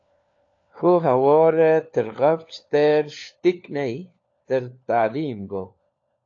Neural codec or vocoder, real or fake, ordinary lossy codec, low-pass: codec, 16 kHz, 4 kbps, FunCodec, trained on LibriTTS, 50 frames a second; fake; AAC, 48 kbps; 7.2 kHz